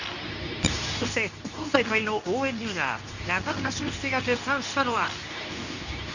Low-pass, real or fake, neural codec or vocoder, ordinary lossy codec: 7.2 kHz; fake; codec, 24 kHz, 0.9 kbps, WavTokenizer, medium speech release version 2; none